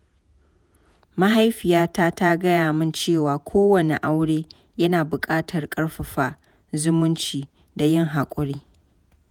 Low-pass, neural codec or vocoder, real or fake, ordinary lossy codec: 19.8 kHz; vocoder, 48 kHz, 128 mel bands, Vocos; fake; none